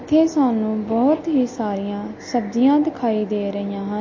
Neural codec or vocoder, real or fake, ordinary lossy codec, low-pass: none; real; MP3, 32 kbps; 7.2 kHz